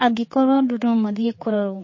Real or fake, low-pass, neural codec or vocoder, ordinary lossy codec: fake; 7.2 kHz; codec, 16 kHz, 4 kbps, X-Codec, HuBERT features, trained on general audio; MP3, 32 kbps